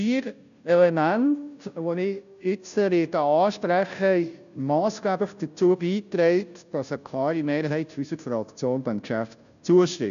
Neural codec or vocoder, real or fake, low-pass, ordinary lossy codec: codec, 16 kHz, 0.5 kbps, FunCodec, trained on Chinese and English, 25 frames a second; fake; 7.2 kHz; none